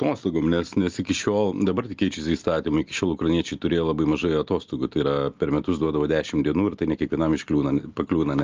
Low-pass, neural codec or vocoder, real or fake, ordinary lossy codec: 7.2 kHz; none; real; Opus, 24 kbps